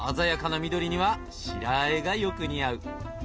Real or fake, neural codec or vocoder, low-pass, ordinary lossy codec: real; none; none; none